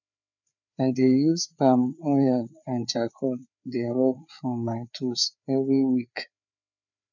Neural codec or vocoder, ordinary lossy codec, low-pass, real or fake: codec, 16 kHz, 4 kbps, FreqCodec, larger model; none; 7.2 kHz; fake